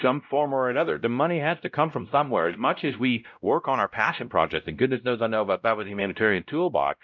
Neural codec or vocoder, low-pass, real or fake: codec, 16 kHz, 0.5 kbps, X-Codec, WavLM features, trained on Multilingual LibriSpeech; 7.2 kHz; fake